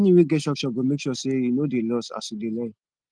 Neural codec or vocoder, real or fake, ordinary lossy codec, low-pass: none; real; Opus, 24 kbps; 9.9 kHz